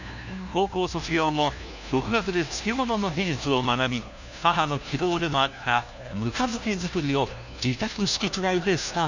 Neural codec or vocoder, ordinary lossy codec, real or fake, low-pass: codec, 16 kHz, 1 kbps, FunCodec, trained on LibriTTS, 50 frames a second; none; fake; 7.2 kHz